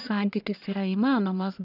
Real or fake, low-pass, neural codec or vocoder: fake; 5.4 kHz; codec, 44.1 kHz, 1.7 kbps, Pupu-Codec